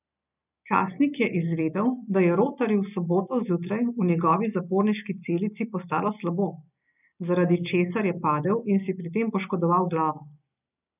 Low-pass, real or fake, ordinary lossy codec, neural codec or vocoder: 3.6 kHz; real; none; none